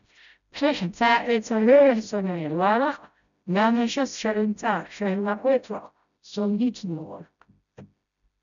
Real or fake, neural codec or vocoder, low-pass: fake; codec, 16 kHz, 0.5 kbps, FreqCodec, smaller model; 7.2 kHz